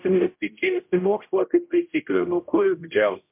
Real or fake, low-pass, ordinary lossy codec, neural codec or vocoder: fake; 3.6 kHz; MP3, 32 kbps; codec, 16 kHz, 0.5 kbps, X-Codec, HuBERT features, trained on general audio